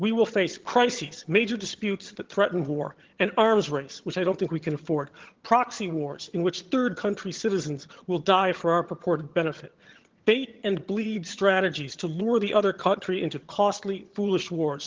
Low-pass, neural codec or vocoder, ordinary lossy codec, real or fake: 7.2 kHz; vocoder, 22.05 kHz, 80 mel bands, HiFi-GAN; Opus, 16 kbps; fake